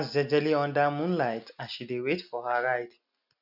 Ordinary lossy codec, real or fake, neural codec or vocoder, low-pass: none; real; none; 5.4 kHz